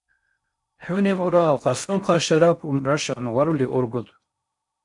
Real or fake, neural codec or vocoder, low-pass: fake; codec, 16 kHz in and 24 kHz out, 0.6 kbps, FocalCodec, streaming, 4096 codes; 10.8 kHz